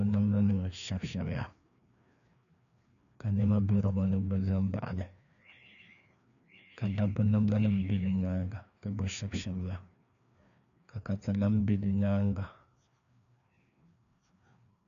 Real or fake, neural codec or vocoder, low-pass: fake; codec, 16 kHz, 2 kbps, FreqCodec, larger model; 7.2 kHz